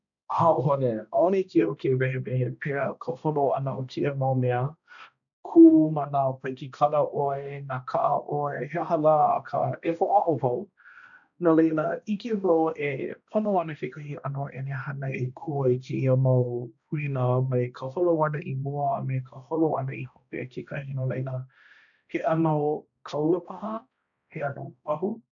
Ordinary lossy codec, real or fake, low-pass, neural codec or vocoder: none; fake; 7.2 kHz; codec, 16 kHz, 1 kbps, X-Codec, HuBERT features, trained on balanced general audio